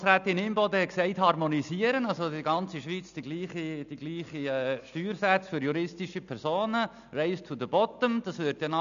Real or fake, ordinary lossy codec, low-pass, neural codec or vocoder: real; none; 7.2 kHz; none